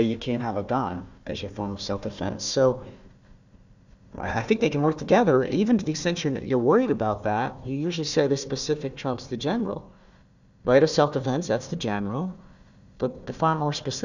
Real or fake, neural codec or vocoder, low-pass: fake; codec, 16 kHz, 1 kbps, FunCodec, trained on Chinese and English, 50 frames a second; 7.2 kHz